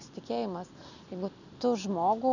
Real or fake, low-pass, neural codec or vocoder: real; 7.2 kHz; none